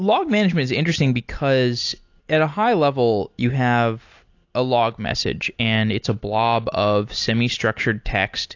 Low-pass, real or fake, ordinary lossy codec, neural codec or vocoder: 7.2 kHz; real; AAC, 48 kbps; none